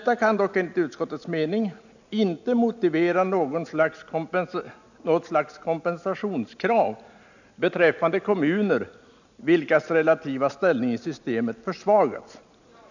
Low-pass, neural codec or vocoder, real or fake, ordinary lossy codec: 7.2 kHz; none; real; none